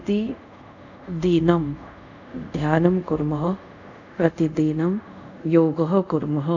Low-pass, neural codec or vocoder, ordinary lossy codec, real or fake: 7.2 kHz; codec, 24 kHz, 0.5 kbps, DualCodec; none; fake